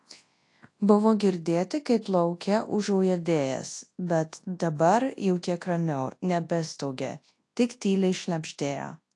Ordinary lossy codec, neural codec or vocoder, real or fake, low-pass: AAC, 48 kbps; codec, 24 kHz, 0.9 kbps, WavTokenizer, large speech release; fake; 10.8 kHz